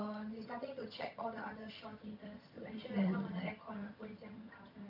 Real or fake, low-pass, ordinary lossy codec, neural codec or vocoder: fake; 5.4 kHz; none; vocoder, 22.05 kHz, 80 mel bands, HiFi-GAN